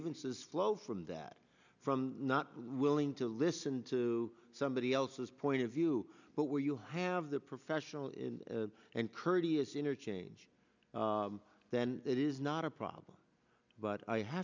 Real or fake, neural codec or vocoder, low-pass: real; none; 7.2 kHz